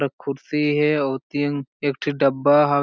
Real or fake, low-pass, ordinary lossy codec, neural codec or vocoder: real; none; none; none